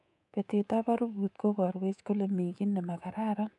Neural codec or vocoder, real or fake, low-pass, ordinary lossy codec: codec, 24 kHz, 3.1 kbps, DualCodec; fake; 10.8 kHz; none